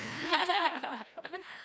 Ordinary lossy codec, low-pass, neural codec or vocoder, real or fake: none; none; codec, 16 kHz, 1 kbps, FreqCodec, larger model; fake